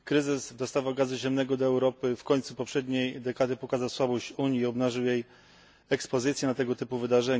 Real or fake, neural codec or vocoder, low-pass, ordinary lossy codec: real; none; none; none